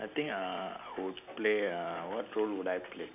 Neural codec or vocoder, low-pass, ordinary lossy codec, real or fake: none; 3.6 kHz; none; real